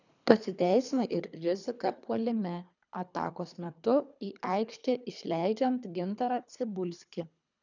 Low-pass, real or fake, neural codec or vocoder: 7.2 kHz; fake; codec, 24 kHz, 3 kbps, HILCodec